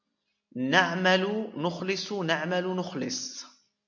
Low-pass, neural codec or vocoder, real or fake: 7.2 kHz; none; real